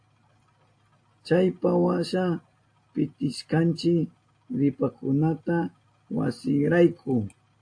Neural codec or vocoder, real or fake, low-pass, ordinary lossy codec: none; real; 9.9 kHz; AAC, 48 kbps